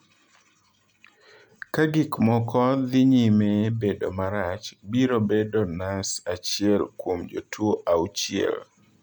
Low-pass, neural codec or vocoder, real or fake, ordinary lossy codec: 19.8 kHz; none; real; none